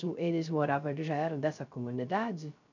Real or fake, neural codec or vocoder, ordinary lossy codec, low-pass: fake; codec, 16 kHz, 0.3 kbps, FocalCodec; none; 7.2 kHz